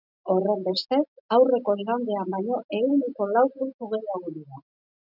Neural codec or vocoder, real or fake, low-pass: none; real; 5.4 kHz